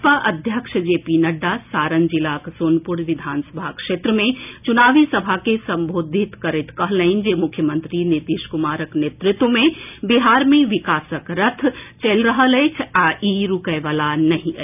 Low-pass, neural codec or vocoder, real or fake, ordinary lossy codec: 3.6 kHz; none; real; none